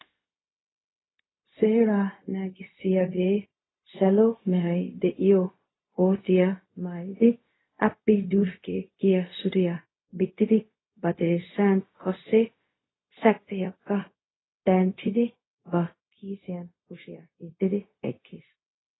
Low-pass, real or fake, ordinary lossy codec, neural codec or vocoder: 7.2 kHz; fake; AAC, 16 kbps; codec, 16 kHz, 0.4 kbps, LongCat-Audio-Codec